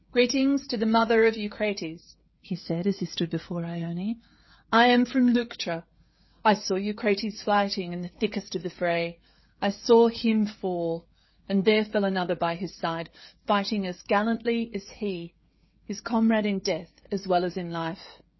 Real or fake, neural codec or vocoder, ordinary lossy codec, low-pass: fake; codec, 16 kHz, 16 kbps, FreqCodec, smaller model; MP3, 24 kbps; 7.2 kHz